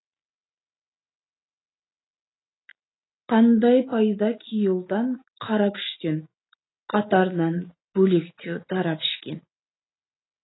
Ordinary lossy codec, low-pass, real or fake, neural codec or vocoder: AAC, 16 kbps; 7.2 kHz; real; none